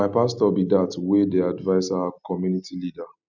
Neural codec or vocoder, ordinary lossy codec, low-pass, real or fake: none; none; 7.2 kHz; real